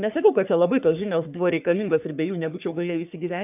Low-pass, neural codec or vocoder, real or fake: 3.6 kHz; codec, 44.1 kHz, 3.4 kbps, Pupu-Codec; fake